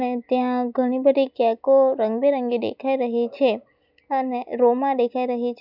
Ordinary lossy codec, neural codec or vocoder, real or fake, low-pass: none; none; real; 5.4 kHz